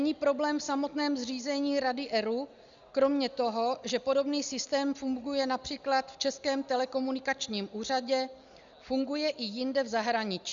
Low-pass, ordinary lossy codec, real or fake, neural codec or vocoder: 7.2 kHz; Opus, 64 kbps; real; none